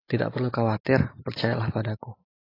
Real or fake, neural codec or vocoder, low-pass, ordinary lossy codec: real; none; 5.4 kHz; AAC, 24 kbps